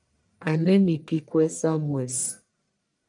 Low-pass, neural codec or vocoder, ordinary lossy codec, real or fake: 10.8 kHz; codec, 44.1 kHz, 1.7 kbps, Pupu-Codec; MP3, 96 kbps; fake